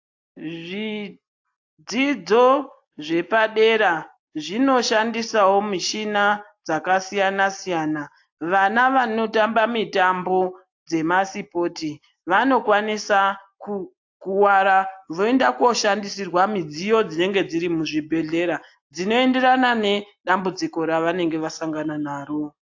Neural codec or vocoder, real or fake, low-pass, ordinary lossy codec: none; real; 7.2 kHz; AAC, 48 kbps